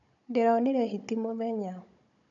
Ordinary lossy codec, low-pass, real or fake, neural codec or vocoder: AAC, 64 kbps; 7.2 kHz; fake; codec, 16 kHz, 16 kbps, FunCodec, trained on Chinese and English, 50 frames a second